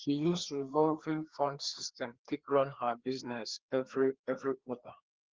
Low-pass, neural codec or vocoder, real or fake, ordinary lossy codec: 7.2 kHz; codec, 16 kHz, 2 kbps, FreqCodec, larger model; fake; Opus, 16 kbps